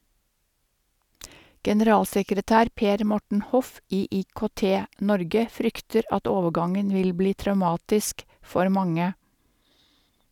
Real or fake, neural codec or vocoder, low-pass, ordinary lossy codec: real; none; 19.8 kHz; none